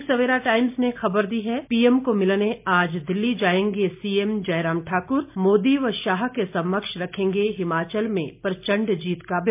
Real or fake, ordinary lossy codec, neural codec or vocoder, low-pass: real; MP3, 32 kbps; none; 3.6 kHz